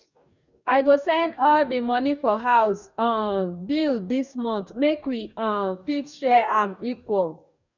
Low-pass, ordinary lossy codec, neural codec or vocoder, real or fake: 7.2 kHz; none; codec, 44.1 kHz, 2.6 kbps, DAC; fake